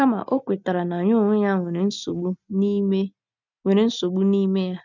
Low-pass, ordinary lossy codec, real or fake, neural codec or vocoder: 7.2 kHz; none; real; none